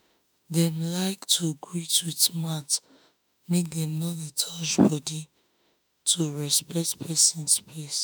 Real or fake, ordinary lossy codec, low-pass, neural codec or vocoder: fake; none; none; autoencoder, 48 kHz, 32 numbers a frame, DAC-VAE, trained on Japanese speech